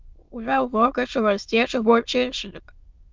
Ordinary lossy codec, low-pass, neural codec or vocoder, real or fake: Opus, 32 kbps; 7.2 kHz; autoencoder, 22.05 kHz, a latent of 192 numbers a frame, VITS, trained on many speakers; fake